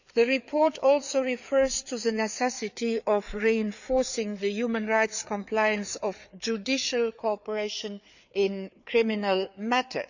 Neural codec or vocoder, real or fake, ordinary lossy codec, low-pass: codec, 16 kHz, 4 kbps, FreqCodec, larger model; fake; none; 7.2 kHz